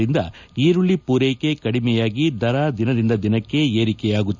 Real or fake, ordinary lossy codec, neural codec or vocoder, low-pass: real; none; none; 7.2 kHz